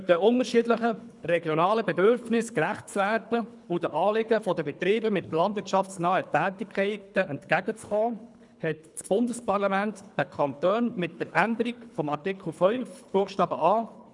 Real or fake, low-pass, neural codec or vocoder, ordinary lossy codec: fake; 10.8 kHz; codec, 24 kHz, 3 kbps, HILCodec; none